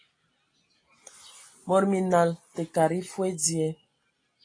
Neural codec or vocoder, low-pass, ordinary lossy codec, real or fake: none; 9.9 kHz; AAC, 32 kbps; real